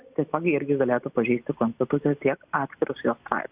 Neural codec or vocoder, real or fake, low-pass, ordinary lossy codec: none; real; 3.6 kHz; Opus, 64 kbps